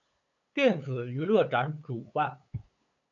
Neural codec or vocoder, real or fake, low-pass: codec, 16 kHz, 8 kbps, FunCodec, trained on LibriTTS, 25 frames a second; fake; 7.2 kHz